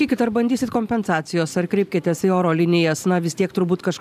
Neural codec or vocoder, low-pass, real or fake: vocoder, 44.1 kHz, 128 mel bands every 512 samples, BigVGAN v2; 14.4 kHz; fake